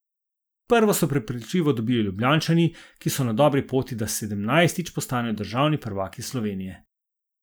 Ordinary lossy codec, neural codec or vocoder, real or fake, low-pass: none; none; real; none